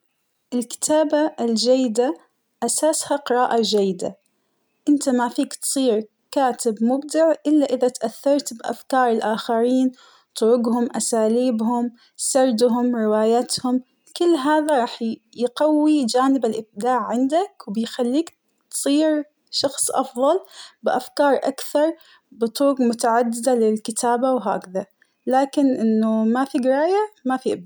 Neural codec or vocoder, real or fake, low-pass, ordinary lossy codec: none; real; none; none